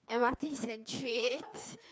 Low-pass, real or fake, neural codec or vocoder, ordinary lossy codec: none; fake; codec, 16 kHz, 2 kbps, FreqCodec, larger model; none